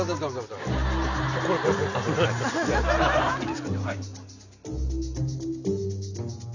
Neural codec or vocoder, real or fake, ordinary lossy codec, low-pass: none; real; none; 7.2 kHz